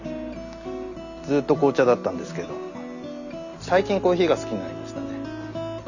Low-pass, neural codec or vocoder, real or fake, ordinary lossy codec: 7.2 kHz; none; real; none